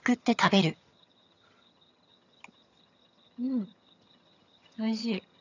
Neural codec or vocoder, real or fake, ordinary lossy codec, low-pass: vocoder, 22.05 kHz, 80 mel bands, HiFi-GAN; fake; none; 7.2 kHz